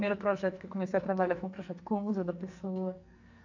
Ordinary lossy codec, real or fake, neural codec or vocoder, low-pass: none; fake; codec, 44.1 kHz, 2.6 kbps, SNAC; 7.2 kHz